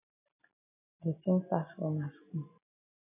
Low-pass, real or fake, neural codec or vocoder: 3.6 kHz; real; none